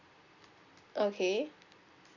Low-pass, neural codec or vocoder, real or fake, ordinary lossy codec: 7.2 kHz; none; real; none